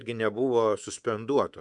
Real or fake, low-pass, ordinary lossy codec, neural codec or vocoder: fake; 10.8 kHz; Opus, 64 kbps; codec, 24 kHz, 3.1 kbps, DualCodec